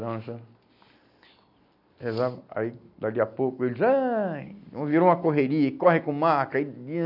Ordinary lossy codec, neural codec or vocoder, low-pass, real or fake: none; none; 5.4 kHz; real